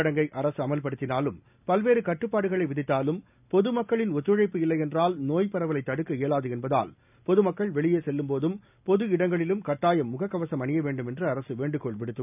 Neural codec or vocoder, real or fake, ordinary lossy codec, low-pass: none; real; none; 3.6 kHz